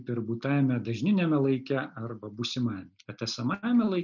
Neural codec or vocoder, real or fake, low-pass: none; real; 7.2 kHz